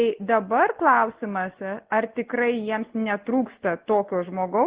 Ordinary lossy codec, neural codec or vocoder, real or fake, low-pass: Opus, 16 kbps; none; real; 3.6 kHz